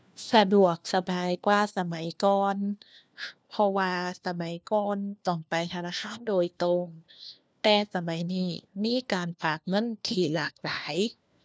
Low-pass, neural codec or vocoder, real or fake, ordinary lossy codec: none; codec, 16 kHz, 1 kbps, FunCodec, trained on LibriTTS, 50 frames a second; fake; none